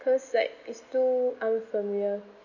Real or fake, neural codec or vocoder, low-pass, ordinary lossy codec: real; none; 7.2 kHz; none